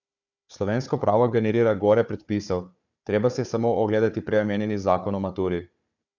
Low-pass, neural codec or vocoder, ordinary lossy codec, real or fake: 7.2 kHz; codec, 16 kHz, 4 kbps, FunCodec, trained on Chinese and English, 50 frames a second; none; fake